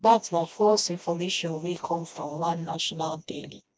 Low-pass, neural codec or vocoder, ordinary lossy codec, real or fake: none; codec, 16 kHz, 1 kbps, FreqCodec, smaller model; none; fake